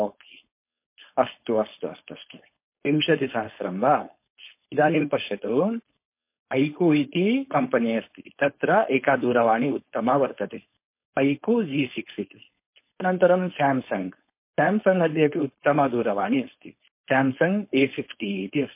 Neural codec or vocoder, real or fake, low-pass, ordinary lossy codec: codec, 16 kHz, 4.8 kbps, FACodec; fake; 3.6 kHz; MP3, 24 kbps